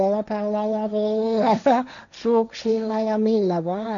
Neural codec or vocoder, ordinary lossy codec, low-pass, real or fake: codec, 16 kHz, 1.1 kbps, Voila-Tokenizer; none; 7.2 kHz; fake